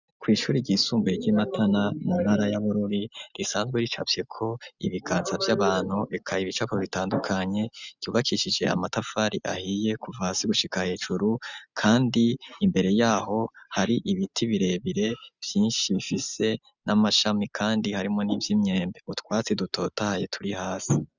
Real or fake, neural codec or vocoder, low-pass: real; none; 7.2 kHz